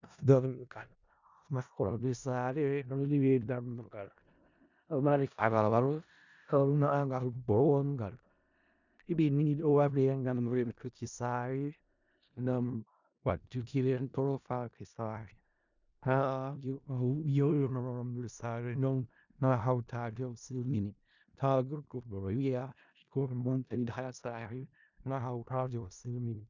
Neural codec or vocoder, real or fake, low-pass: codec, 16 kHz in and 24 kHz out, 0.4 kbps, LongCat-Audio-Codec, four codebook decoder; fake; 7.2 kHz